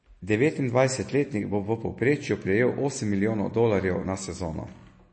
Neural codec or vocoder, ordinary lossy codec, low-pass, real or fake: none; MP3, 32 kbps; 9.9 kHz; real